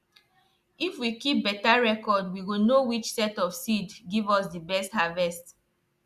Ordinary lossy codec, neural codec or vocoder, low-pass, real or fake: Opus, 64 kbps; none; 14.4 kHz; real